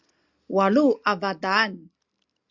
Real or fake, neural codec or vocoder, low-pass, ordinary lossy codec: real; none; 7.2 kHz; Opus, 64 kbps